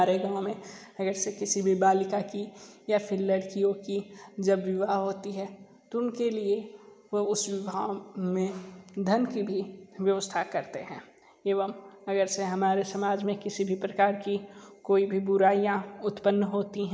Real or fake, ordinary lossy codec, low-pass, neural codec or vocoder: real; none; none; none